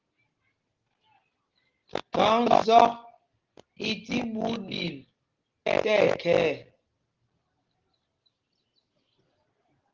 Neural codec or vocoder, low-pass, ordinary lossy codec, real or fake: none; 7.2 kHz; Opus, 16 kbps; real